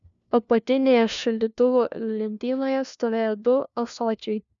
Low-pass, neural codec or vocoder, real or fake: 7.2 kHz; codec, 16 kHz, 1 kbps, FunCodec, trained on LibriTTS, 50 frames a second; fake